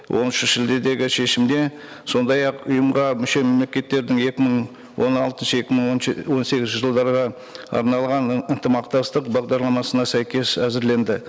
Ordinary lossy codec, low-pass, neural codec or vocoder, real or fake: none; none; none; real